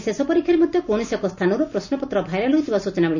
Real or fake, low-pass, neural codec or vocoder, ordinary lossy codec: real; 7.2 kHz; none; none